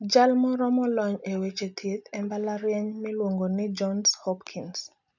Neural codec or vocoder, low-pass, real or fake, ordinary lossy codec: none; 7.2 kHz; real; none